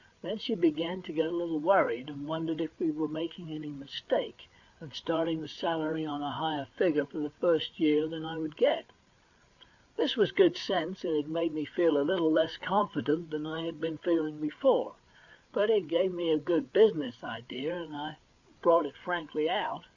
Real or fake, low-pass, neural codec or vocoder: fake; 7.2 kHz; codec, 16 kHz, 8 kbps, FreqCodec, larger model